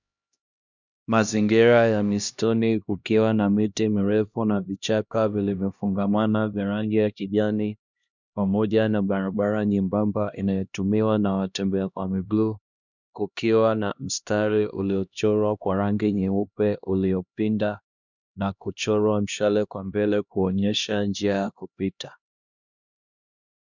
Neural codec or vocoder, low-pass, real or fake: codec, 16 kHz, 1 kbps, X-Codec, HuBERT features, trained on LibriSpeech; 7.2 kHz; fake